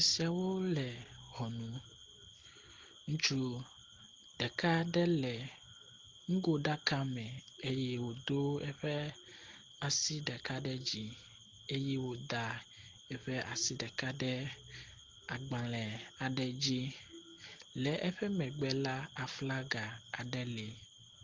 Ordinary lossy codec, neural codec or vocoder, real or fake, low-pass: Opus, 16 kbps; none; real; 7.2 kHz